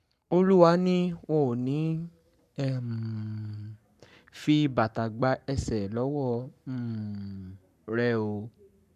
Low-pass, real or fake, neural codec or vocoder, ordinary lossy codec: 14.4 kHz; fake; codec, 44.1 kHz, 7.8 kbps, Pupu-Codec; none